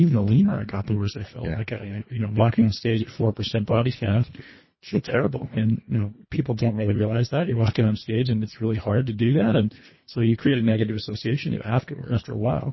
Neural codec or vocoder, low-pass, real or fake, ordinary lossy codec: codec, 24 kHz, 1.5 kbps, HILCodec; 7.2 kHz; fake; MP3, 24 kbps